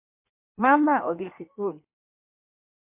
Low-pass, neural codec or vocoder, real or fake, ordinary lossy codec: 3.6 kHz; codec, 16 kHz in and 24 kHz out, 1.1 kbps, FireRedTTS-2 codec; fake; MP3, 32 kbps